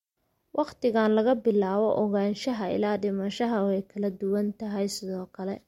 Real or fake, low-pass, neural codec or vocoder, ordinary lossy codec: real; 19.8 kHz; none; MP3, 64 kbps